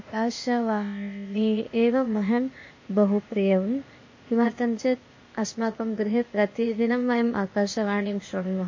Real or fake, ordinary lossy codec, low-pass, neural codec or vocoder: fake; MP3, 32 kbps; 7.2 kHz; codec, 16 kHz, 0.8 kbps, ZipCodec